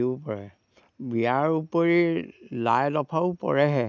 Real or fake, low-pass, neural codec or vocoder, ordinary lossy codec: real; 7.2 kHz; none; none